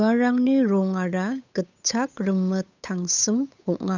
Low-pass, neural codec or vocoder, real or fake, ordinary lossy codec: 7.2 kHz; codec, 16 kHz, 8 kbps, FunCodec, trained on Chinese and English, 25 frames a second; fake; none